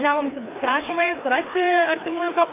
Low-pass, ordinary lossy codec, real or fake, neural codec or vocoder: 3.6 kHz; AAC, 24 kbps; fake; codec, 16 kHz, 2 kbps, FreqCodec, larger model